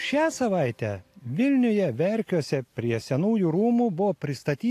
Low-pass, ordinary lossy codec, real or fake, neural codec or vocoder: 14.4 kHz; AAC, 64 kbps; real; none